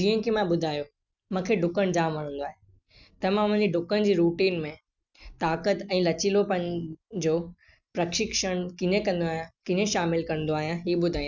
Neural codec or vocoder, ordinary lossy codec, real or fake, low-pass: none; none; real; 7.2 kHz